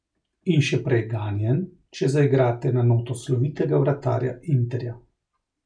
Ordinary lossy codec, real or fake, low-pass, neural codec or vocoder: none; real; 9.9 kHz; none